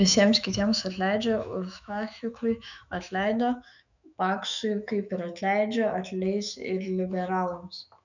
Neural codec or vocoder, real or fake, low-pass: autoencoder, 48 kHz, 128 numbers a frame, DAC-VAE, trained on Japanese speech; fake; 7.2 kHz